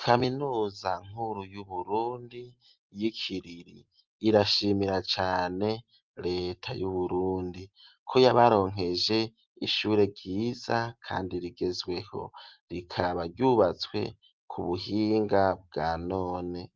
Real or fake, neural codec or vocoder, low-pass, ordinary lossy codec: real; none; 7.2 kHz; Opus, 32 kbps